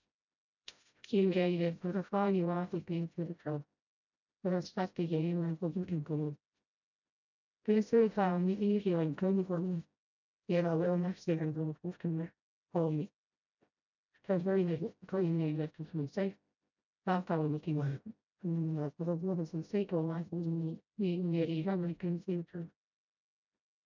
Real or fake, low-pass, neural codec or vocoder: fake; 7.2 kHz; codec, 16 kHz, 0.5 kbps, FreqCodec, smaller model